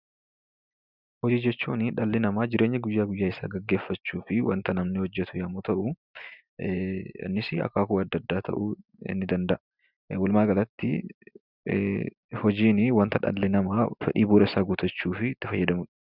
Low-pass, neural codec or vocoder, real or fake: 5.4 kHz; none; real